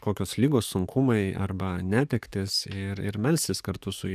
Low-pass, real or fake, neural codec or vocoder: 14.4 kHz; fake; codec, 44.1 kHz, 7.8 kbps, DAC